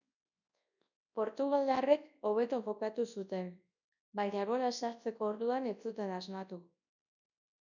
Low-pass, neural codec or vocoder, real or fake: 7.2 kHz; codec, 24 kHz, 0.9 kbps, WavTokenizer, large speech release; fake